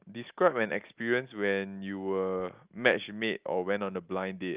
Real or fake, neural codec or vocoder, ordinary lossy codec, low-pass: real; none; Opus, 32 kbps; 3.6 kHz